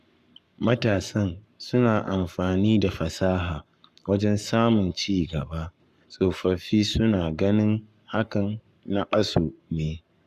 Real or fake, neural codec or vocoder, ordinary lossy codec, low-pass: fake; codec, 44.1 kHz, 7.8 kbps, Pupu-Codec; Opus, 64 kbps; 14.4 kHz